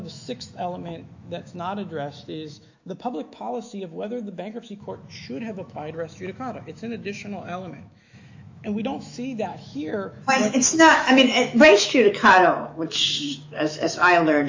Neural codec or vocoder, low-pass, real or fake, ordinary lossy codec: autoencoder, 48 kHz, 128 numbers a frame, DAC-VAE, trained on Japanese speech; 7.2 kHz; fake; AAC, 48 kbps